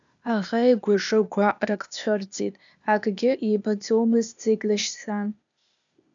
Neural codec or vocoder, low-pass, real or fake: codec, 16 kHz, 0.8 kbps, ZipCodec; 7.2 kHz; fake